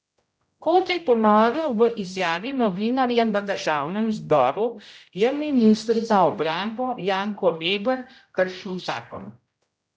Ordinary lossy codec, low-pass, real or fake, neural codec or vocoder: none; none; fake; codec, 16 kHz, 0.5 kbps, X-Codec, HuBERT features, trained on general audio